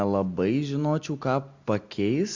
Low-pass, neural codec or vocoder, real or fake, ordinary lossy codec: 7.2 kHz; none; real; Opus, 64 kbps